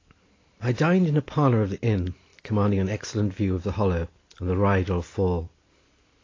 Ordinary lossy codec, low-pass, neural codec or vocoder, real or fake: AAC, 32 kbps; 7.2 kHz; none; real